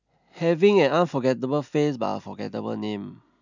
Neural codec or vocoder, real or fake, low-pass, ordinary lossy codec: none; real; 7.2 kHz; none